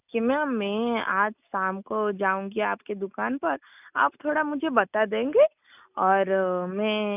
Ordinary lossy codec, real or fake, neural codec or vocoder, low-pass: none; real; none; 3.6 kHz